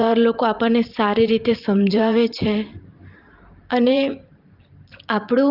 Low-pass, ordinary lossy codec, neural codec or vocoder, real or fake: 5.4 kHz; Opus, 24 kbps; vocoder, 44.1 kHz, 128 mel bands every 512 samples, BigVGAN v2; fake